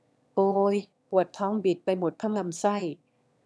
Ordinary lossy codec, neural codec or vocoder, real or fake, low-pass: none; autoencoder, 22.05 kHz, a latent of 192 numbers a frame, VITS, trained on one speaker; fake; none